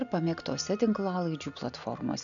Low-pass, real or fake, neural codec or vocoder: 7.2 kHz; real; none